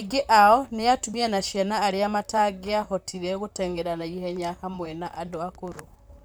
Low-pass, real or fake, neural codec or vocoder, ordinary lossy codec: none; fake; vocoder, 44.1 kHz, 128 mel bands, Pupu-Vocoder; none